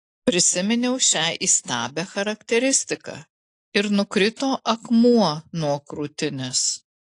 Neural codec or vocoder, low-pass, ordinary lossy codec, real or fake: none; 10.8 kHz; AAC, 48 kbps; real